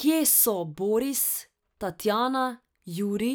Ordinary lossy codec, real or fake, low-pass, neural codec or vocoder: none; real; none; none